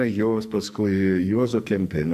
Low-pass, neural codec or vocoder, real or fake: 14.4 kHz; codec, 44.1 kHz, 2.6 kbps, SNAC; fake